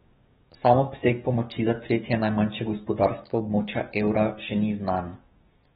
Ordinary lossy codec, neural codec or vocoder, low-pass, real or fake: AAC, 16 kbps; codec, 16 kHz, 6 kbps, DAC; 7.2 kHz; fake